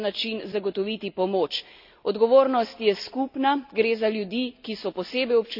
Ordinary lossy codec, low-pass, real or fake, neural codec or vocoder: none; 5.4 kHz; real; none